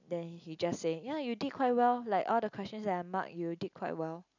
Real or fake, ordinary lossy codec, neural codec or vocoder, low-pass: real; none; none; 7.2 kHz